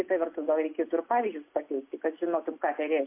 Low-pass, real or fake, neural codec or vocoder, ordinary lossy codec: 3.6 kHz; real; none; MP3, 24 kbps